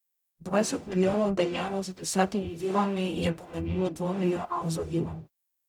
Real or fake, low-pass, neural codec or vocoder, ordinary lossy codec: fake; 19.8 kHz; codec, 44.1 kHz, 0.9 kbps, DAC; none